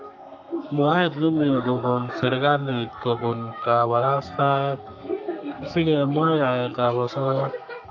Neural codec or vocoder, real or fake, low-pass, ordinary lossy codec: codec, 32 kHz, 1.9 kbps, SNAC; fake; 7.2 kHz; AAC, 48 kbps